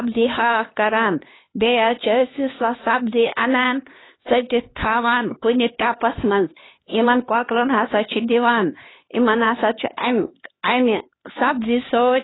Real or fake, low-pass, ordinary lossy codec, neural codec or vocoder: fake; 7.2 kHz; AAC, 16 kbps; codec, 16 kHz, 2 kbps, X-Codec, HuBERT features, trained on LibriSpeech